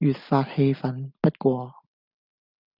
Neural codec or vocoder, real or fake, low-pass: none; real; 5.4 kHz